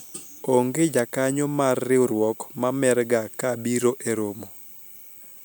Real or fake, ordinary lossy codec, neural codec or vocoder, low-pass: real; none; none; none